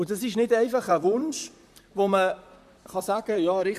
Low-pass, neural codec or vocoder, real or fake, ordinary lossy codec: 14.4 kHz; vocoder, 44.1 kHz, 128 mel bands, Pupu-Vocoder; fake; MP3, 96 kbps